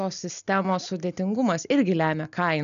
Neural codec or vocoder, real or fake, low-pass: none; real; 7.2 kHz